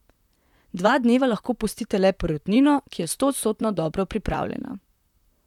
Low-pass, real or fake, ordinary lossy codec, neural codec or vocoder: 19.8 kHz; fake; none; vocoder, 44.1 kHz, 128 mel bands, Pupu-Vocoder